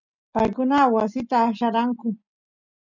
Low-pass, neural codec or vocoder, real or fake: 7.2 kHz; none; real